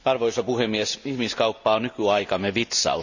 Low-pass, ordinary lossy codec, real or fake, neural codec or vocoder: 7.2 kHz; none; real; none